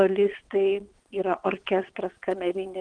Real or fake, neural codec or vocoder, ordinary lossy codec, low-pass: fake; vocoder, 22.05 kHz, 80 mel bands, WaveNeXt; Opus, 24 kbps; 9.9 kHz